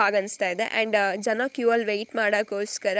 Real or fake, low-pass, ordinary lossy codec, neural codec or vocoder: fake; none; none; codec, 16 kHz, 16 kbps, FunCodec, trained on LibriTTS, 50 frames a second